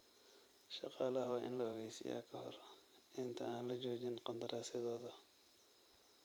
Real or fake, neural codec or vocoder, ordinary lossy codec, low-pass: fake; vocoder, 44.1 kHz, 128 mel bands every 512 samples, BigVGAN v2; none; none